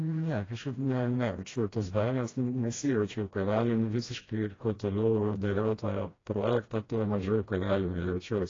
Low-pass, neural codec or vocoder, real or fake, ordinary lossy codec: 7.2 kHz; codec, 16 kHz, 1 kbps, FreqCodec, smaller model; fake; AAC, 32 kbps